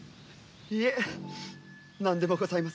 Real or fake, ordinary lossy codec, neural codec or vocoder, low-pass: real; none; none; none